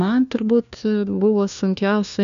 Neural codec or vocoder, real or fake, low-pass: codec, 16 kHz, 1 kbps, FunCodec, trained on LibriTTS, 50 frames a second; fake; 7.2 kHz